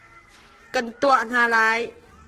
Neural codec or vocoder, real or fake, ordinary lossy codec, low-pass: codec, 44.1 kHz, 7.8 kbps, Pupu-Codec; fake; Opus, 16 kbps; 14.4 kHz